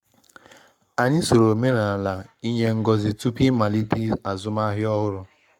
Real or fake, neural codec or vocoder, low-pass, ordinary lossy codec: fake; codec, 44.1 kHz, 7.8 kbps, Pupu-Codec; 19.8 kHz; none